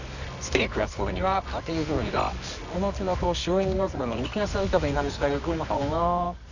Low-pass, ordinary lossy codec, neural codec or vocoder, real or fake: 7.2 kHz; none; codec, 24 kHz, 0.9 kbps, WavTokenizer, medium music audio release; fake